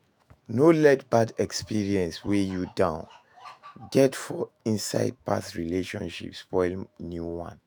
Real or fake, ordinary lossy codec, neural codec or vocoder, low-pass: fake; none; autoencoder, 48 kHz, 128 numbers a frame, DAC-VAE, trained on Japanese speech; none